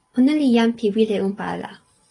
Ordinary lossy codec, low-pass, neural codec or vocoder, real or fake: AAC, 32 kbps; 10.8 kHz; none; real